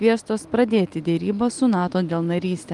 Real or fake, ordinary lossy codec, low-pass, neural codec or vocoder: real; Opus, 32 kbps; 10.8 kHz; none